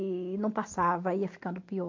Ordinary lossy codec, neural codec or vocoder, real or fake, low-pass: MP3, 48 kbps; none; real; 7.2 kHz